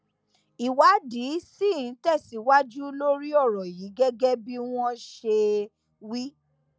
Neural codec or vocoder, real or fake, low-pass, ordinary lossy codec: none; real; none; none